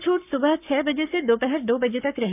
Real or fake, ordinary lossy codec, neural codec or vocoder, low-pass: fake; none; codec, 44.1 kHz, 7.8 kbps, Pupu-Codec; 3.6 kHz